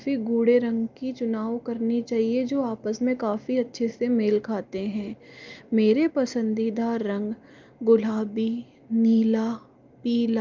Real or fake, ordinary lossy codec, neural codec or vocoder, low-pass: real; Opus, 32 kbps; none; 7.2 kHz